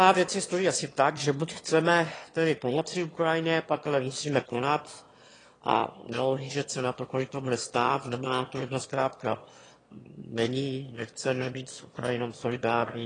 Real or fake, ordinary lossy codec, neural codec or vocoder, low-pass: fake; AAC, 32 kbps; autoencoder, 22.05 kHz, a latent of 192 numbers a frame, VITS, trained on one speaker; 9.9 kHz